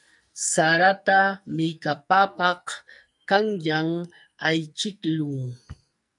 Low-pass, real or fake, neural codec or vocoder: 10.8 kHz; fake; codec, 44.1 kHz, 2.6 kbps, SNAC